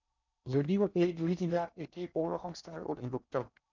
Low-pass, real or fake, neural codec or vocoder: 7.2 kHz; fake; codec, 16 kHz in and 24 kHz out, 0.8 kbps, FocalCodec, streaming, 65536 codes